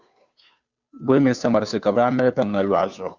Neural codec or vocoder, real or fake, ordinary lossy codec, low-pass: codec, 16 kHz, 2 kbps, FunCodec, trained on Chinese and English, 25 frames a second; fake; Opus, 64 kbps; 7.2 kHz